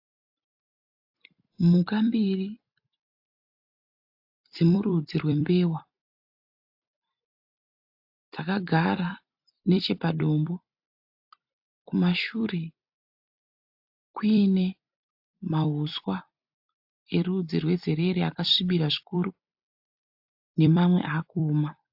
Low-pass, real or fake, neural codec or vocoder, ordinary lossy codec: 5.4 kHz; fake; vocoder, 44.1 kHz, 128 mel bands every 256 samples, BigVGAN v2; AAC, 48 kbps